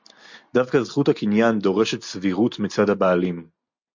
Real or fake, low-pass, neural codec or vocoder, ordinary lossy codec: real; 7.2 kHz; none; MP3, 48 kbps